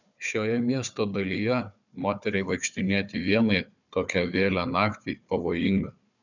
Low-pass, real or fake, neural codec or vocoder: 7.2 kHz; fake; codec, 16 kHz, 4 kbps, FunCodec, trained on Chinese and English, 50 frames a second